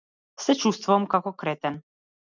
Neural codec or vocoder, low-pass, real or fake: none; 7.2 kHz; real